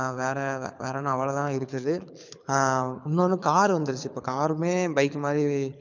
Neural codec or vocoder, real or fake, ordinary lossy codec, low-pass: codec, 24 kHz, 6 kbps, HILCodec; fake; none; 7.2 kHz